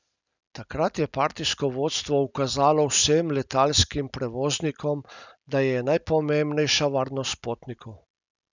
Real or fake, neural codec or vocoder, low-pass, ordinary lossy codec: real; none; 7.2 kHz; none